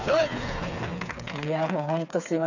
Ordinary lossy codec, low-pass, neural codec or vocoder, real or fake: none; 7.2 kHz; codec, 16 kHz, 4 kbps, FreqCodec, smaller model; fake